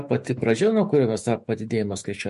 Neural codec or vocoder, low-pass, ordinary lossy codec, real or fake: autoencoder, 48 kHz, 128 numbers a frame, DAC-VAE, trained on Japanese speech; 14.4 kHz; MP3, 48 kbps; fake